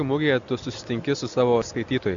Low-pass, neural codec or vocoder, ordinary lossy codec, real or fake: 7.2 kHz; none; Opus, 64 kbps; real